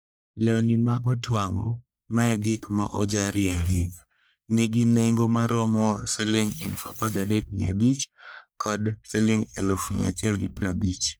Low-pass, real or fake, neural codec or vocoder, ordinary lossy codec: none; fake; codec, 44.1 kHz, 1.7 kbps, Pupu-Codec; none